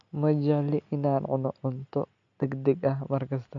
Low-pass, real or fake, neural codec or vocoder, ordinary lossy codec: 7.2 kHz; real; none; none